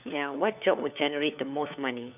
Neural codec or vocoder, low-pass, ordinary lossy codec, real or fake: codec, 16 kHz, 4 kbps, FunCodec, trained on LibriTTS, 50 frames a second; 3.6 kHz; none; fake